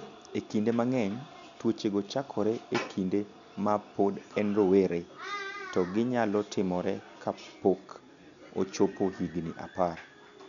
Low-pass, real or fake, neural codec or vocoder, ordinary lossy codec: 7.2 kHz; real; none; none